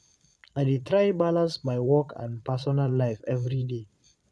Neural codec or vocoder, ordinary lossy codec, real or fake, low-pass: vocoder, 22.05 kHz, 80 mel bands, Vocos; none; fake; none